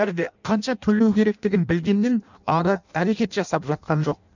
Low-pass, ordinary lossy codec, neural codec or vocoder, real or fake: 7.2 kHz; none; codec, 16 kHz in and 24 kHz out, 0.6 kbps, FireRedTTS-2 codec; fake